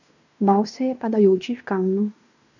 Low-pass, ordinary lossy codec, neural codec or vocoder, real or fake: 7.2 kHz; none; codec, 16 kHz in and 24 kHz out, 0.9 kbps, LongCat-Audio-Codec, fine tuned four codebook decoder; fake